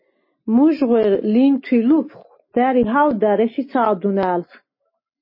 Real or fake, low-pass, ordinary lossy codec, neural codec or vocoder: real; 5.4 kHz; MP3, 24 kbps; none